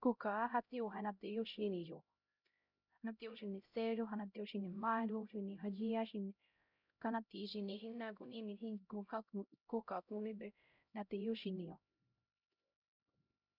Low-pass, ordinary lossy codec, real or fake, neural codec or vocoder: 5.4 kHz; none; fake; codec, 16 kHz, 0.5 kbps, X-Codec, HuBERT features, trained on LibriSpeech